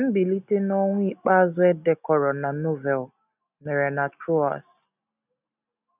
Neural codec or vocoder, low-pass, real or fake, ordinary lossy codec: none; 3.6 kHz; real; none